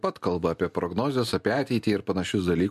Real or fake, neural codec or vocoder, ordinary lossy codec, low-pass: real; none; MP3, 64 kbps; 14.4 kHz